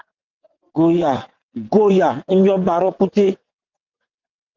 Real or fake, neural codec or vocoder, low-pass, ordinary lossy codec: fake; vocoder, 22.05 kHz, 80 mel bands, WaveNeXt; 7.2 kHz; Opus, 32 kbps